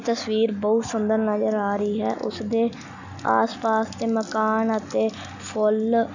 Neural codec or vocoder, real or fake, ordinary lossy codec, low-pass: none; real; none; 7.2 kHz